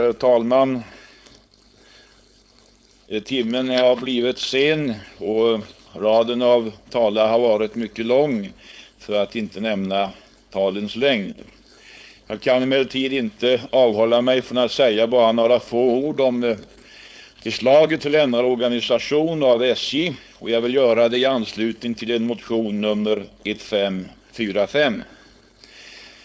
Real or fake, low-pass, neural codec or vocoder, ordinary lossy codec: fake; none; codec, 16 kHz, 4.8 kbps, FACodec; none